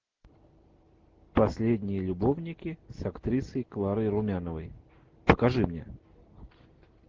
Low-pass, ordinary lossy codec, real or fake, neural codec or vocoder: 7.2 kHz; Opus, 16 kbps; real; none